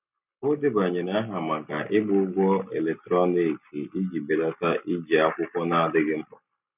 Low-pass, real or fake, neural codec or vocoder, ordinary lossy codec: 3.6 kHz; real; none; none